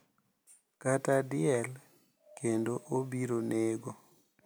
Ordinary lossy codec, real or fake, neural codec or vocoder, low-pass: none; real; none; none